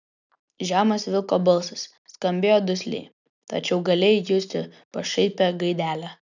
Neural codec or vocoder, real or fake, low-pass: none; real; 7.2 kHz